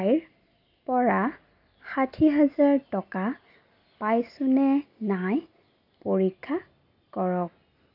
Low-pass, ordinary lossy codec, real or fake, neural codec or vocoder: 5.4 kHz; AAC, 48 kbps; real; none